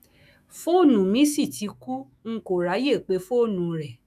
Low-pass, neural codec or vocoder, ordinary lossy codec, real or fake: 14.4 kHz; autoencoder, 48 kHz, 128 numbers a frame, DAC-VAE, trained on Japanese speech; none; fake